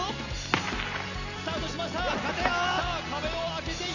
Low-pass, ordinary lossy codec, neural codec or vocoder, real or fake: 7.2 kHz; none; none; real